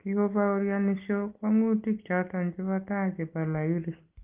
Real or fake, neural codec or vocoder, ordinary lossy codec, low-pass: real; none; Opus, 24 kbps; 3.6 kHz